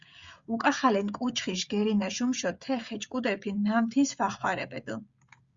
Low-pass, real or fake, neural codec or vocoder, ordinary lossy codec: 7.2 kHz; fake; codec, 16 kHz, 16 kbps, FreqCodec, smaller model; Opus, 64 kbps